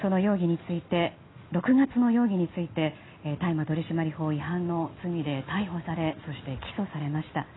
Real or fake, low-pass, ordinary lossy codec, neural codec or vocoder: real; 7.2 kHz; AAC, 16 kbps; none